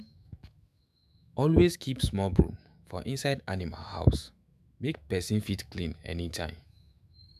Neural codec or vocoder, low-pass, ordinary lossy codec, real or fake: autoencoder, 48 kHz, 128 numbers a frame, DAC-VAE, trained on Japanese speech; 14.4 kHz; none; fake